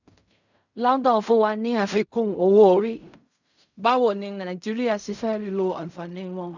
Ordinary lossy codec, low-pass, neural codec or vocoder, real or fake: none; 7.2 kHz; codec, 16 kHz in and 24 kHz out, 0.4 kbps, LongCat-Audio-Codec, fine tuned four codebook decoder; fake